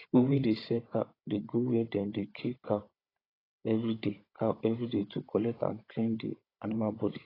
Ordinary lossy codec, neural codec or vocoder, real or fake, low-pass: AAC, 24 kbps; codec, 16 kHz, 16 kbps, FunCodec, trained on Chinese and English, 50 frames a second; fake; 5.4 kHz